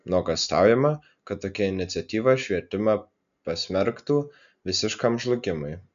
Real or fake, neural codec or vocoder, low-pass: real; none; 7.2 kHz